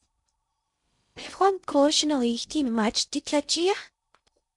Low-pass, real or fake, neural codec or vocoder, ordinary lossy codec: 10.8 kHz; fake; codec, 16 kHz in and 24 kHz out, 0.6 kbps, FocalCodec, streaming, 2048 codes; MP3, 96 kbps